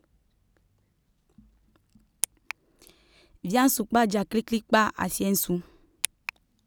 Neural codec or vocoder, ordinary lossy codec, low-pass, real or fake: none; none; none; real